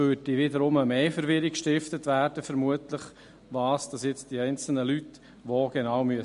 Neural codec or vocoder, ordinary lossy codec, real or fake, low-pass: none; MP3, 48 kbps; real; 10.8 kHz